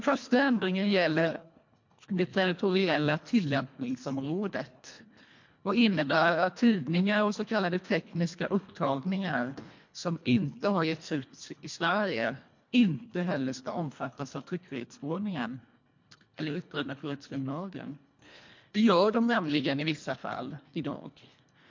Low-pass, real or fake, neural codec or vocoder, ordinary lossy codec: 7.2 kHz; fake; codec, 24 kHz, 1.5 kbps, HILCodec; MP3, 48 kbps